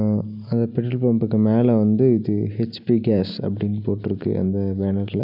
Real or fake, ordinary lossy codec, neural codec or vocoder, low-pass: real; none; none; 5.4 kHz